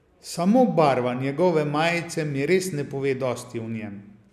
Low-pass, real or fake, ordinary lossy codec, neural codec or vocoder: 14.4 kHz; real; none; none